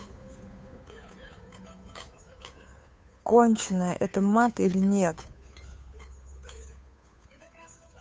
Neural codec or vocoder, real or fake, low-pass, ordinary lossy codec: codec, 16 kHz, 2 kbps, FunCodec, trained on Chinese and English, 25 frames a second; fake; none; none